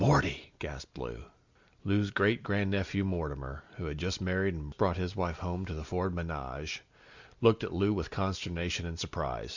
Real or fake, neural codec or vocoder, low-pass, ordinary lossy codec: real; none; 7.2 kHz; Opus, 64 kbps